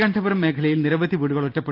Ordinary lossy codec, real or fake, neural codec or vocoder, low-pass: Opus, 24 kbps; real; none; 5.4 kHz